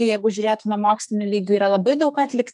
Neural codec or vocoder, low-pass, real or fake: codec, 32 kHz, 1.9 kbps, SNAC; 10.8 kHz; fake